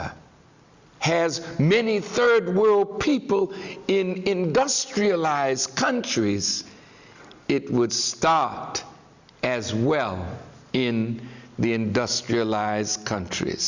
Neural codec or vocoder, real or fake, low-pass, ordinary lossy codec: none; real; 7.2 kHz; Opus, 64 kbps